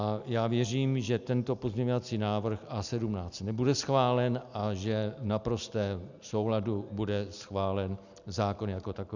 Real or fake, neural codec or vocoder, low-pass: real; none; 7.2 kHz